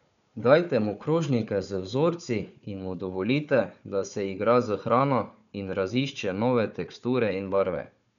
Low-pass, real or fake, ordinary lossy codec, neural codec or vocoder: 7.2 kHz; fake; none; codec, 16 kHz, 4 kbps, FunCodec, trained on Chinese and English, 50 frames a second